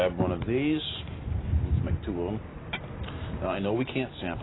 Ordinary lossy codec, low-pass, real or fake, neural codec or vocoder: AAC, 16 kbps; 7.2 kHz; real; none